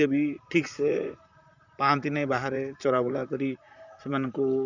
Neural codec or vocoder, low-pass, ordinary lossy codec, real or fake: vocoder, 44.1 kHz, 128 mel bands, Pupu-Vocoder; 7.2 kHz; none; fake